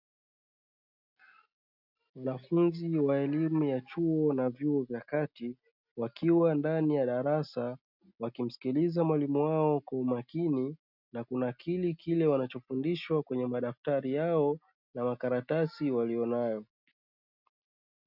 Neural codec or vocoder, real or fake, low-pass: none; real; 5.4 kHz